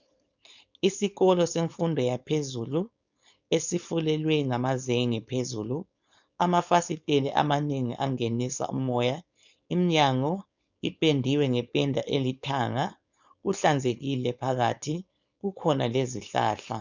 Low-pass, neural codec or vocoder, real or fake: 7.2 kHz; codec, 16 kHz, 4.8 kbps, FACodec; fake